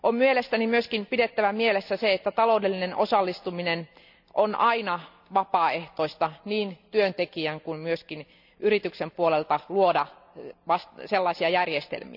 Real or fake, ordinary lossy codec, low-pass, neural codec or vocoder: real; none; 5.4 kHz; none